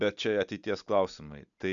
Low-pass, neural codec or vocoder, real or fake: 7.2 kHz; none; real